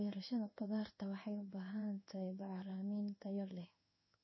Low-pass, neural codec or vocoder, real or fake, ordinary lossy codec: 7.2 kHz; codec, 16 kHz in and 24 kHz out, 1 kbps, XY-Tokenizer; fake; MP3, 24 kbps